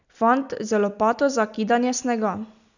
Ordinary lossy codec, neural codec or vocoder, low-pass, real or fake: none; none; 7.2 kHz; real